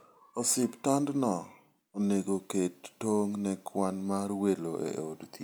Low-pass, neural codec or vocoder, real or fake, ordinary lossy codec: none; none; real; none